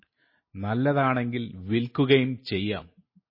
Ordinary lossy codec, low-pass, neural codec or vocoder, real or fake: MP3, 24 kbps; 5.4 kHz; none; real